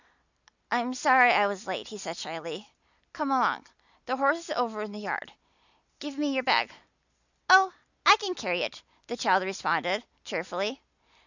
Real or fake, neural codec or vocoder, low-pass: real; none; 7.2 kHz